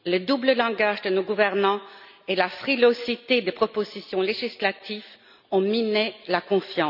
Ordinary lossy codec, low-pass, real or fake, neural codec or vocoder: none; 5.4 kHz; real; none